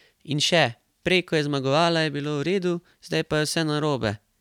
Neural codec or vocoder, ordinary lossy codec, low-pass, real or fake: none; none; 19.8 kHz; real